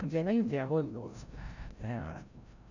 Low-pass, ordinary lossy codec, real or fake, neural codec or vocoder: 7.2 kHz; none; fake; codec, 16 kHz, 0.5 kbps, FreqCodec, larger model